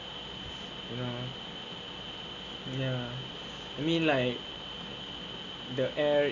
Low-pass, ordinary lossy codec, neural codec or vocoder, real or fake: 7.2 kHz; none; none; real